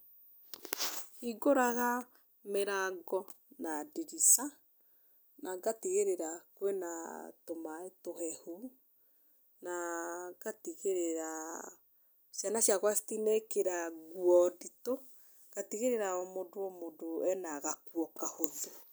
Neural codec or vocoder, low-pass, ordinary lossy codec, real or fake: none; none; none; real